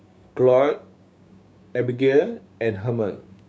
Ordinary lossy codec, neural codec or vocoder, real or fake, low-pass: none; codec, 16 kHz, 6 kbps, DAC; fake; none